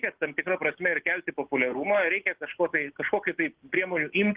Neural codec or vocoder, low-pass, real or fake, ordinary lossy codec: none; 3.6 kHz; real; Opus, 16 kbps